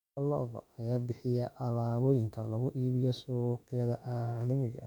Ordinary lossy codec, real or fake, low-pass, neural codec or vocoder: none; fake; 19.8 kHz; autoencoder, 48 kHz, 32 numbers a frame, DAC-VAE, trained on Japanese speech